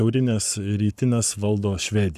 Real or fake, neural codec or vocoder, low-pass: fake; codec, 44.1 kHz, 7.8 kbps, Pupu-Codec; 14.4 kHz